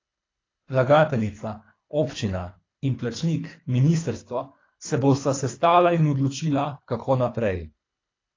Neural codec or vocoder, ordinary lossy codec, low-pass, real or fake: codec, 24 kHz, 3 kbps, HILCodec; AAC, 32 kbps; 7.2 kHz; fake